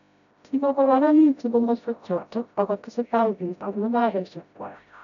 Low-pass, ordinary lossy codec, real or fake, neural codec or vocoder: 7.2 kHz; none; fake; codec, 16 kHz, 0.5 kbps, FreqCodec, smaller model